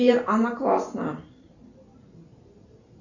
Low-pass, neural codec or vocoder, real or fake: 7.2 kHz; vocoder, 44.1 kHz, 128 mel bands every 512 samples, BigVGAN v2; fake